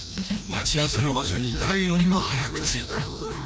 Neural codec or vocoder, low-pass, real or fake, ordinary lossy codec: codec, 16 kHz, 1 kbps, FreqCodec, larger model; none; fake; none